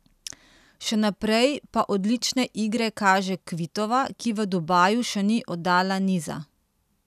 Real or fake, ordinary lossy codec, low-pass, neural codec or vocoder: real; none; 14.4 kHz; none